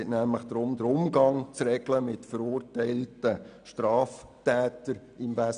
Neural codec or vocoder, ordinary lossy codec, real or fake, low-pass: none; none; real; 9.9 kHz